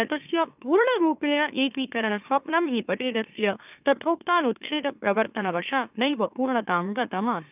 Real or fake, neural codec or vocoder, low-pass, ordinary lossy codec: fake; autoencoder, 44.1 kHz, a latent of 192 numbers a frame, MeloTTS; 3.6 kHz; none